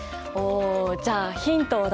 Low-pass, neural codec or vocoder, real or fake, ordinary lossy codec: none; none; real; none